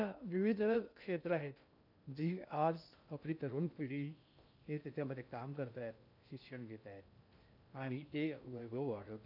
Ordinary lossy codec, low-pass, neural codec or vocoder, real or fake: none; 5.4 kHz; codec, 16 kHz in and 24 kHz out, 0.6 kbps, FocalCodec, streaming, 2048 codes; fake